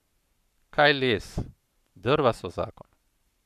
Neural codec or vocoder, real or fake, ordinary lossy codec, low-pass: codec, 44.1 kHz, 7.8 kbps, Pupu-Codec; fake; none; 14.4 kHz